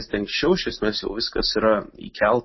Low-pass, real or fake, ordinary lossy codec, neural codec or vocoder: 7.2 kHz; real; MP3, 24 kbps; none